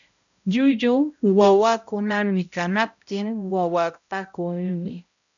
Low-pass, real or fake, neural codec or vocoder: 7.2 kHz; fake; codec, 16 kHz, 0.5 kbps, X-Codec, HuBERT features, trained on balanced general audio